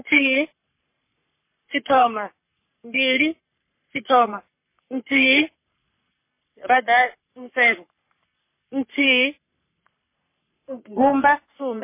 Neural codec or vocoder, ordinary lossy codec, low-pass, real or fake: codec, 44.1 kHz, 3.4 kbps, Pupu-Codec; MP3, 24 kbps; 3.6 kHz; fake